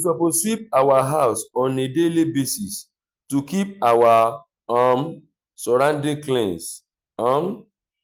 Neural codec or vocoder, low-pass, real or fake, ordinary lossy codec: none; 19.8 kHz; real; Opus, 32 kbps